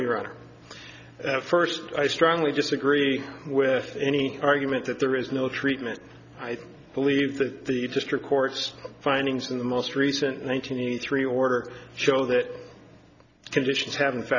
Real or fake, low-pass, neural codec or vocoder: real; 7.2 kHz; none